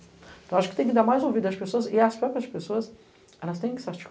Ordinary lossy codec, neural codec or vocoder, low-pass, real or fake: none; none; none; real